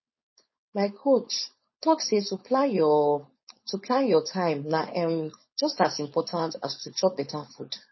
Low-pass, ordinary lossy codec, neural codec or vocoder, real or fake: 7.2 kHz; MP3, 24 kbps; codec, 16 kHz, 4.8 kbps, FACodec; fake